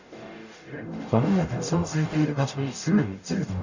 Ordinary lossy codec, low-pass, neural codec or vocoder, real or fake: none; 7.2 kHz; codec, 44.1 kHz, 0.9 kbps, DAC; fake